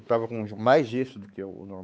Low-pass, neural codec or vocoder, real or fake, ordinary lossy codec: none; codec, 16 kHz, 4 kbps, X-Codec, WavLM features, trained on Multilingual LibriSpeech; fake; none